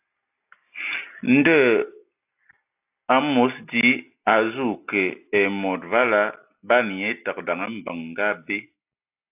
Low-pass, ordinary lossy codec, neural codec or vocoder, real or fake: 3.6 kHz; AAC, 32 kbps; none; real